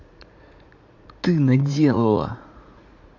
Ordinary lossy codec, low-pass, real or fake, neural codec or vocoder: AAC, 48 kbps; 7.2 kHz; fake; vocoder, 44.1 kHz, 80 mel bands, Vocos